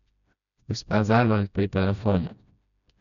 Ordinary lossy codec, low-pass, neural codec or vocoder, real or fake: none; 7.2 kHz; codec, 16 kHz, 1 kbps, FreqCodec, smaller model; fake